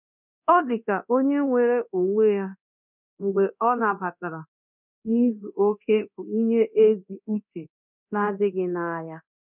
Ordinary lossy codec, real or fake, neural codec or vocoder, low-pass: none; fake; codec, 24 kHz, 0.9 kbps, DualCodec; 3.6 kHz